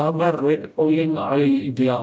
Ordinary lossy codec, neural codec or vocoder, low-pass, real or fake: none; codec, 16 kHz, 0.5 kbps, FreqCodec, smaller model; none; fake